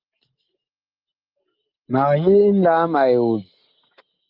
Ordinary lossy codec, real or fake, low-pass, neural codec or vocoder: Opus, 24 kbps; real; 5.4 kHz; none